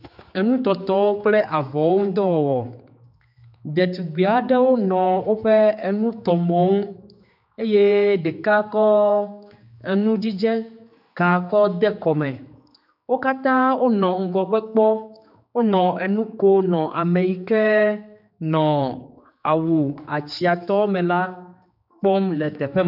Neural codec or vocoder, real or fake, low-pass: codec, 16 kHz, 4 kbps, X-Codec, HuBERT features, trained on general audio; fake; 5.4 kHz